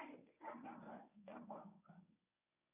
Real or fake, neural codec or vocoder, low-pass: fake; codec, 24 kHz, 0.9 kbps, WavTokenizer, medium speech release version 1; 3.6 kHz